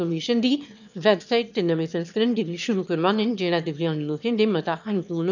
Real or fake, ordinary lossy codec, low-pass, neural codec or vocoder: fake; none; 7.2 kHz; autoencoder, 22.05 kHz, a latent of 192 numbers a frame, VITS, trained on one speaker